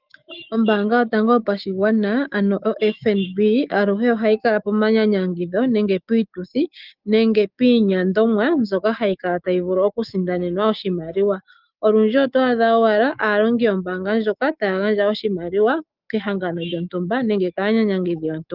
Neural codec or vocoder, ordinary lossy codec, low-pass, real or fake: autoencoder, 48 kHz, 128 numbers a frame, DAC-VAE, trained on Japanese speech; Opus, 24 kbps; 5.4 kHz; fake